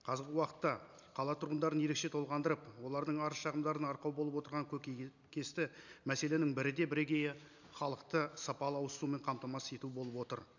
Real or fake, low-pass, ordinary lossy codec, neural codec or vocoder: real; 7.2 kHz; none; none